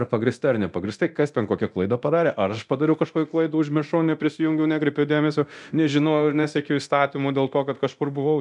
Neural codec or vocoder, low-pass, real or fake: codec, 24 kHz, 0.9 kbps, DualCodec; 10.8 kHz; fake